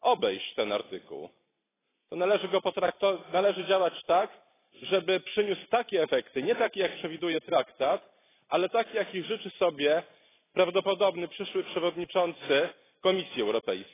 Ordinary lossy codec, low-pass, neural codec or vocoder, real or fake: AAC, 16 kbps; 3.6 kHz; none; real